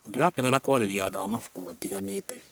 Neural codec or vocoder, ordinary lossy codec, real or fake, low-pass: codec, 44.1 kHz, 1.7 kbps, Pupu-Codec; none; fake; none